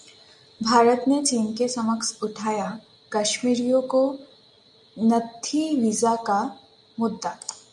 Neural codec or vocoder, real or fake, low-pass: none; real; 10.8 kHz